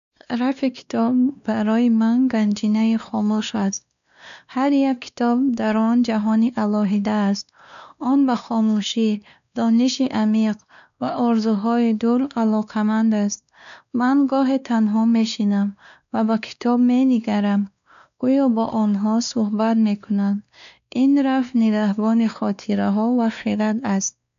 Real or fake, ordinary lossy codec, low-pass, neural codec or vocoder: fake; none; 7.2 kHz; codec, 16 kHz, 2 kbps, X-Codec, WavLM features, trained on Multilingual LibriSpeech